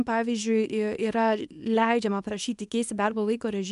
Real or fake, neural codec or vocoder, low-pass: fake; codec, 24 kHz, 0.9 kbps, WavTokenizer, small release; 10.8 kHz